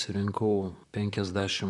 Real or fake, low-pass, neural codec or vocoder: fake; 10.8 kHz; vocoder, 44.1 kHz, 128 mel bands, Pupu-Vocoder